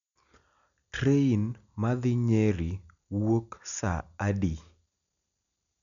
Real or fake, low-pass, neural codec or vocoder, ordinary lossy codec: real; 7.2 kHz; none; none